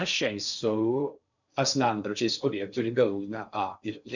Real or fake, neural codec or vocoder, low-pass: fake; codec, 16 kHz in and 24 kHz out, 0.6 kbps, FocalCodec, streaming, 4096 codes; 7.2 kHz